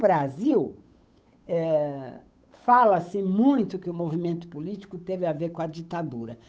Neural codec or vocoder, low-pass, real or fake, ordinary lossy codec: codec, 16 kHz, 8 kbps, FunCodec, trained on Chinese and English, 25 frames a second; none; fake; none